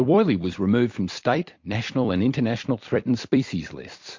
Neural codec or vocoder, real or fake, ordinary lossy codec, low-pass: vocoder, 44.1 kHz, 128 mel bands every 256 samples, BigVGAN v2; fake; MP3, 48 kbps; 7.2 kHz